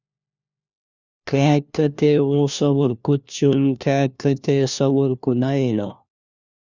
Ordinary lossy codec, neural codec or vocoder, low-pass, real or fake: Opus, 64 kbps; codec, 16 kHz, 1 kbps, FunCodec, trained on LibriTTS, 50 frames a second; 7.2 kHz; fake